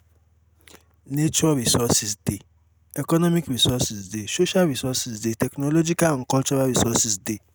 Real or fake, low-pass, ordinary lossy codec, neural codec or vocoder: real; none; none; none